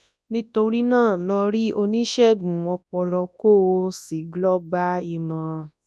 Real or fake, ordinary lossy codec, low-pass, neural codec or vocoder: fake; none; none; codec, 24 kHz, 0.9 kbps, WavTokenizer, large speech release